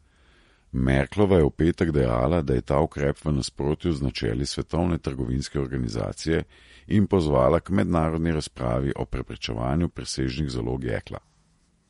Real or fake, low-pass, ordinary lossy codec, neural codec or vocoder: fake; 19.8 kHz; MP3, 48 kbps; vocoder, 48 kHz, 128 mel bands, Vocos